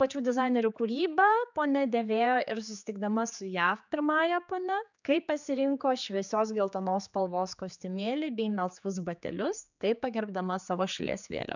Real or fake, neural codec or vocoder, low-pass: fake; codec, 16 kHz, 4 kbps, X-Codec, HuBERT features, trained on general audio; 7.2 kHz